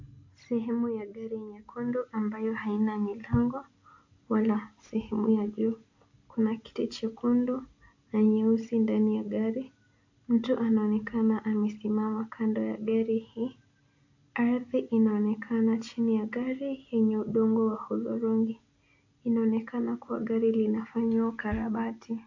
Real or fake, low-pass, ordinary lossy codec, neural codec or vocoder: real; 7.2 kHz; AAC, 48 kbps; none